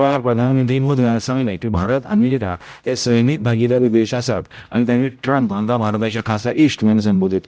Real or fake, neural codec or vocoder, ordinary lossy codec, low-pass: fake; codec, 16 kHz, 0.5 kbps, X-Codec, HuBERT features, trained on general audio; none; none